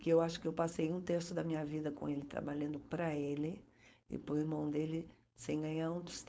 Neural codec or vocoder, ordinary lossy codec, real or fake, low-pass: codec, 16 kHz, 4.8 kbps, FACodec; none; fake; none